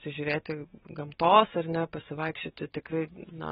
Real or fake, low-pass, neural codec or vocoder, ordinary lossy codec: real; 9.9 kHz; none; AAC, 16 kbps